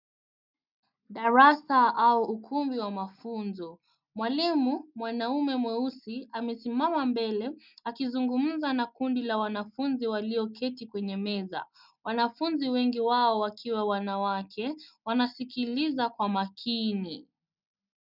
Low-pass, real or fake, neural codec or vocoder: 5.4 kHz; real; none